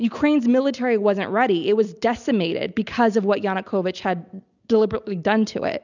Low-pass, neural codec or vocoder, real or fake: 7.2 kHz; none; real